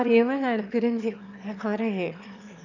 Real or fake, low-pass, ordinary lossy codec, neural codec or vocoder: fake; 7.2 kHz; none; autoencoder, 22.05 kHz, a latent of 192 numbers a frame, VITS, trained on one speaker